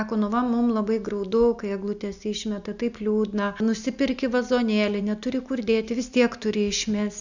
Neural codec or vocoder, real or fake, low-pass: none; real; 7.2 kHz